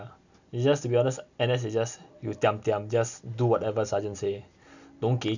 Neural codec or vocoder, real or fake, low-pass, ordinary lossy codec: none; real; 7.2 kHz; none